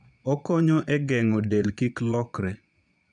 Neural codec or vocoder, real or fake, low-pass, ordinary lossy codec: vocoder, 22.05 kHz, 80 mel bands, Vocos; fake; 9.9 kHz; none